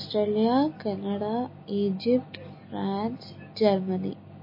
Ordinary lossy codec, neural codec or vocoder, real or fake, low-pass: MP3, 24 kbps; none; real; 5.4 kHz